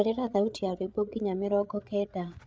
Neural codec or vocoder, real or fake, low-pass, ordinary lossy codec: codec, 16 kHz, 8 kbps, FreqCodec, larger model; fake; none; none